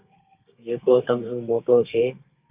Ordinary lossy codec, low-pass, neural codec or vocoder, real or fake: Opus, 64 kbps; 3.6 kHz; codec, 32 kHz, 1.9 kbps, SNAC; fake